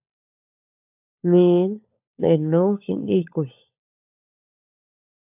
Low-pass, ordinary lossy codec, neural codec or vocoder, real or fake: 3.6 kHz; AAC, 32 kbps; codec, 16 kHz, 4 kbps, FunCodec, trained on LibriTTS, 50 frames a second; fake